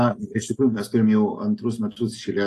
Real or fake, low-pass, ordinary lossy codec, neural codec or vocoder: real; 14.4 kHz; AAC, 48 kbps; none